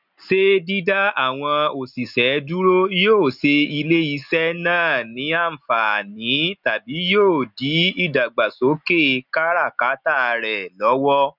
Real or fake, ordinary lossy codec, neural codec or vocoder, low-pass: real; none; none; 5.4 kHz